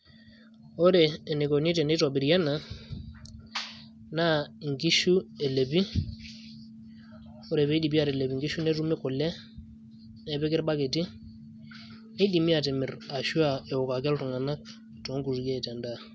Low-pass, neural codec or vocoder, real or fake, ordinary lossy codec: none; none; real; none